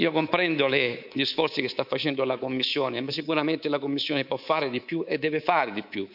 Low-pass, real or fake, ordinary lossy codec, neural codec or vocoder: 5.4 kHz; fake; none; codec, 24 kHz, 3.1 kbps, DualCodec